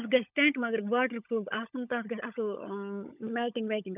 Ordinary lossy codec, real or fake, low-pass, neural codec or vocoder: none; fake; 3.6 kHz; codec, 16 kHz, 16 kbps, FunCodec, trained on LibriTTS, 50 frames a second